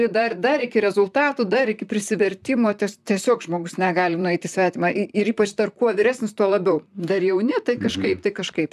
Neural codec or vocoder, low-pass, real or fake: vocoder, 44.1 kHz, 128 mel bands, Pupu-Vocoder; 14.4 kHz; fake